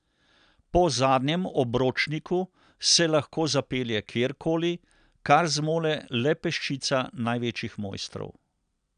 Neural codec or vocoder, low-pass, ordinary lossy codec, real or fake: none; 9.9 kHz; none; real